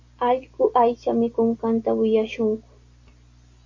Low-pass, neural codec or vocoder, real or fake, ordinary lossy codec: 7.2 kHz; none; real; AAC, 48 kbps